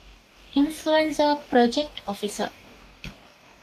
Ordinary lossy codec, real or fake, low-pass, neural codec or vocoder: MP3, 96 kbps; fake; 14.4 kHz; codec, 44.1 kHz, 2.6 kbps, DAC